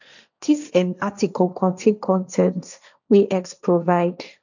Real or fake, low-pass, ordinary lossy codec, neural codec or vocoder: fake; 7.2 kHz; none; codec, 16 kHz, 1.1 kbps, Voila-Tokenizer